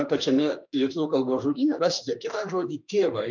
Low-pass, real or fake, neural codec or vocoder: 7.2 kHz; fake; autoencoder, 48 kHz, 32 numbers a frame, DAC-VAE, trained on Japanese speech